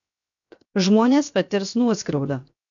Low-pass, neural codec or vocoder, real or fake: 7.2 kHz; codec, 16 kHz, 0.7 kbps, FocalCodec; fake